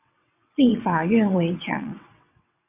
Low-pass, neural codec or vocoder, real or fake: 3.6 kHz; vocoder, 22.05 kHz, 80 mel bands, WaveNeXt; fake